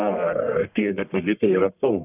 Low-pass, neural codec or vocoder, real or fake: 3.6 kHz; codec, 44.1 kHz, 1.7 kbps, Pupu-Codec; fake